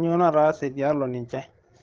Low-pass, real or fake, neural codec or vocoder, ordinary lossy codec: 7.2 kHz; fake; codec, 16 kHz, 8 kbps, FreqCodec, larger model; Opus, 16 kbps